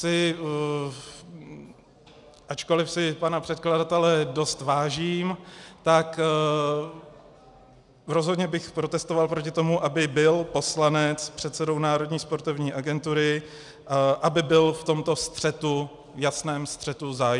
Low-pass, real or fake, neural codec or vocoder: 10.8 kHz; real; none